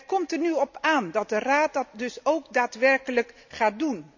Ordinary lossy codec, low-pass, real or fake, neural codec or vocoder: none; 7.2 kHz; real; none